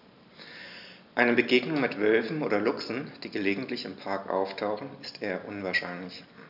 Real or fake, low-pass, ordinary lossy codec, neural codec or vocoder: real; 5.4 kHz; none; none